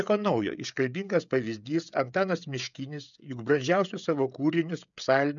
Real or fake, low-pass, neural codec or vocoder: fake; 7.2 kHz; codec, 16 kHz, 16 kbps, FreqCodec, smaller model